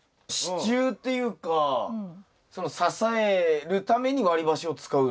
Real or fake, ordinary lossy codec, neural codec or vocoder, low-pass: real; none; none; none